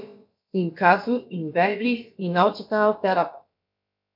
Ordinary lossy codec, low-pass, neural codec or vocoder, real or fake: MP3, 48 kbps; 5.4 kHz; codec, 16 kHz, about 1 kbps, DyCAST, with the encoder's durations; fake